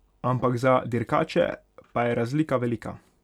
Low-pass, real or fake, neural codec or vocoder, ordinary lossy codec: 19.8 kHz; real; none; none